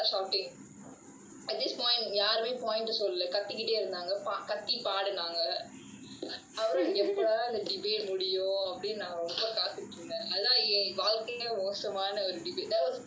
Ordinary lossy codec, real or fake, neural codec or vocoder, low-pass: none; real; none; none